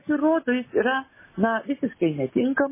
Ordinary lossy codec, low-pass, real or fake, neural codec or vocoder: MP3, 16 kbps; 3.6 kHz; real; none